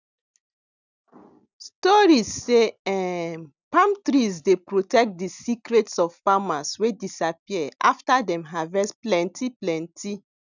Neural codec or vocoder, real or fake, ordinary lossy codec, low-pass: none; real; none; 7.2 kHz